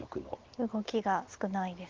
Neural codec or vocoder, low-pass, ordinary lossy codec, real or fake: none; 7.2 kHz; Opus, 16 kbps; real